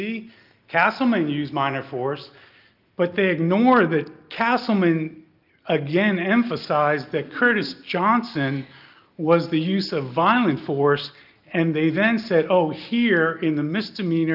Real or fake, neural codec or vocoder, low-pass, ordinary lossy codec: real; none; 5.4 kHz; Opus, 32 kbps